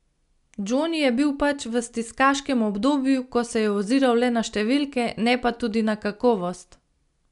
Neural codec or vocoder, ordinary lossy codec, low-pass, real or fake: none; none; 10.8 kHz; real